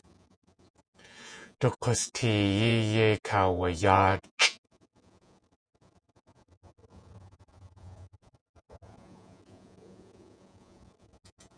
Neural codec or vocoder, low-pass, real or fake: vocoder, 48 kHz, 128 mel bands, Vocos; 9.9 kHz; fake